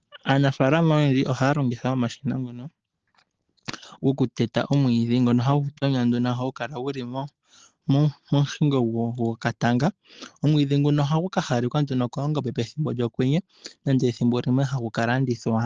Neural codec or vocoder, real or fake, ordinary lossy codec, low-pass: none; real; Opus, 16 kbps; 7.2 kHz